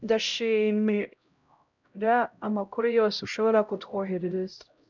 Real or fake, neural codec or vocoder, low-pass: fake; codec, 16 kHz, 0.5 kbps, X-Codec, HuBERT features, trained on LibriSpeech; 7.2 kHz